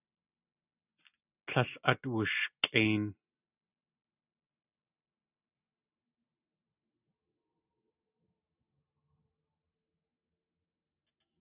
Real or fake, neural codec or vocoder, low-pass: real; none; 3.6 kHz